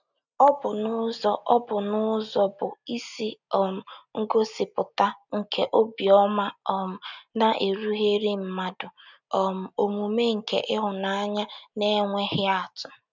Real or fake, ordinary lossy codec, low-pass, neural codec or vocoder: real; none; 7.2 kHz; none